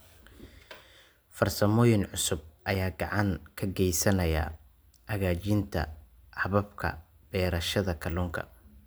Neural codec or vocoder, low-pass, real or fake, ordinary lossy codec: none; none; real; none